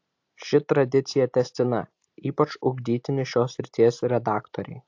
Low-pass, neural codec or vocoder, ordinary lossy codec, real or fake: 7.2 kHz; none; AAC, 48 kbps; real